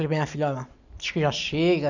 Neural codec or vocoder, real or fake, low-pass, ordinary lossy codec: none; real; 7.2 kHz; none